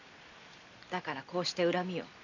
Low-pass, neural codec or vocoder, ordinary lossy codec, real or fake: 7.2 kHz; none; none; real